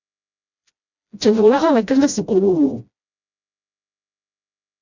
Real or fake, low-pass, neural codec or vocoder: fake; 7.2 kHz; codec, 16 kHz, 0.5 kbps, FreqCodec, smaller model